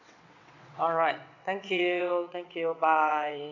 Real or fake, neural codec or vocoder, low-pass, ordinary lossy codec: fake; vocoder, 22.05 kHz, 80 mel bands, WaveNeXt; 7.2 kHz; none